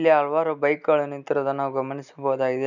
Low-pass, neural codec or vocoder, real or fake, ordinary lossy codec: 7.2 kHz; none; real; none